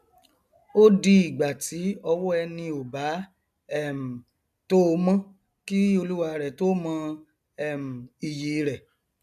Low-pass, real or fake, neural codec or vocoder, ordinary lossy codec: 14.4 kHz; real; none; none